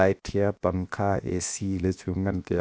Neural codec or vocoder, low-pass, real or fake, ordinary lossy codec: codec, 16 kHz, 0.7 kbps, FocalCodec; none; fake; none